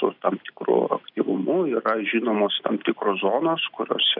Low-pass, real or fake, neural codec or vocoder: 5.4 kHz; real; none